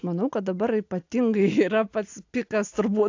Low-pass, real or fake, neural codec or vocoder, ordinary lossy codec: 7.2 kHz; real; none; AAC, 48 kbps